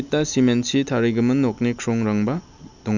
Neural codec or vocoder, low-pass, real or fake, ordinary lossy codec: none; 7.2 kHz; real; none